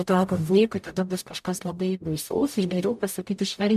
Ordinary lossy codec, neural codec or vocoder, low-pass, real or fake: MP3, 96 kbps; codec, 44.1 kHz, 0.9 kbps, DAC; 14.4 kHz; fake